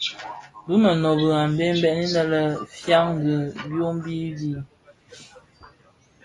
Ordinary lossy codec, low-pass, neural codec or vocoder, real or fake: AAC, 32 kbps; 10.8 kHz; none; real